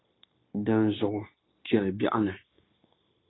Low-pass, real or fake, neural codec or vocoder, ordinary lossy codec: 7.2 kHz; fake; codec, 16 kHz, 0.9 kbps, LongCat-Audio-Codec; AAC, 16 kbps